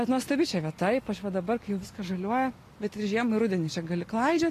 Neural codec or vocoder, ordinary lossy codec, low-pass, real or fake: none; AAC, 48 kbps; 14.4 kHz; real